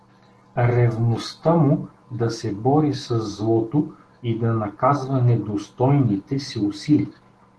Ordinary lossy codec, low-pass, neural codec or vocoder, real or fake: Opus, 16 kbps; 10.8 kHz; none; real